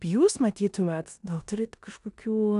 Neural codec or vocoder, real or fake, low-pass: codec, 16 kHz in and 24 kHz out, 0.9 kbps, LongCat-Audio-Codec, four codebook decoder; fake; 10.8 kHz